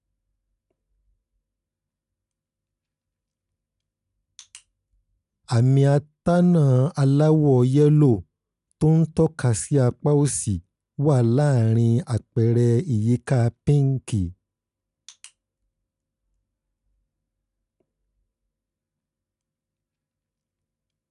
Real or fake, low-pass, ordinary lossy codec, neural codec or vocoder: real; 10.8 kHz; none; none